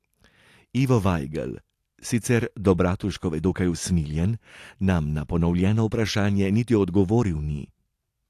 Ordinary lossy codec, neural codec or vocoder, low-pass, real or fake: AAC, 64 kbps; none; 14.4 kHz; real